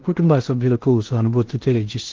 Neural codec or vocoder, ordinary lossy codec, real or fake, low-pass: codec, 16 kHz in and 24 kHz out, 0.6 kbps, FocalCodec, streaming, 2048 codes; Opus, 16 kbps; fake; 7.2 kHz